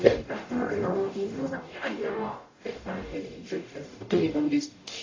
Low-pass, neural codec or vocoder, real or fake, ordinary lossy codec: 7.2 kHz; codec, 44.1 kHz, 0.9 kbps, DAC; fake; AAC, 32 kbps